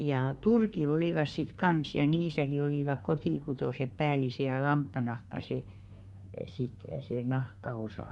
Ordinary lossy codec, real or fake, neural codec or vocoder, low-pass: none; fake; codec, 24 kHz, 1 kbps, SNAC; 10.8 kHz